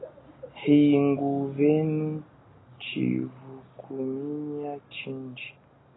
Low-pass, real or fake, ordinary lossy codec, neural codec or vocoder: 7.2 kHz; real; AAC, 16 kbps; none